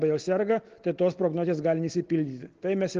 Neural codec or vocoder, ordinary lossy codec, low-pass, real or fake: none; Opus, 16 kbps; 7.2 kHz; real